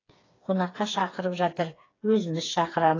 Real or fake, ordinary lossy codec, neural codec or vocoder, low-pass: fake; AAC, 32 kbps; codec, 16 kHz, 4 kbps, FreqCodec, smaller model; 7.2 kHz